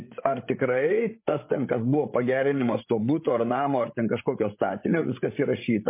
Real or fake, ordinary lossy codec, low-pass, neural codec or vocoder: fake; MP3, 24 kbps; 3.6 kHz; codec, 16 kHz, 16 kbps, FreqCodec, larger model